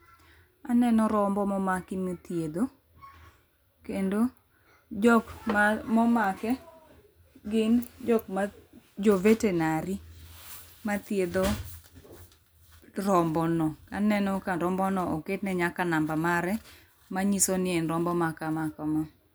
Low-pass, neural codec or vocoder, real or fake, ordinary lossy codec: none; none; real; none